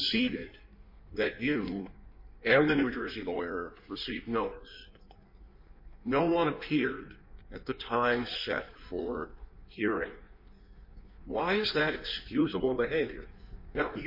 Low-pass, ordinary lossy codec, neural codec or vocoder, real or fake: 5.4 kHz; MP3, 32 kbps; codec, 16 kHz in and 24 kHz out, 1.1 kbps, FireRedTTS-2 codec; fake